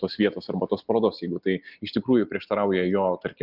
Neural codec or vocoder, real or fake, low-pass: none; real; 5.4 kHz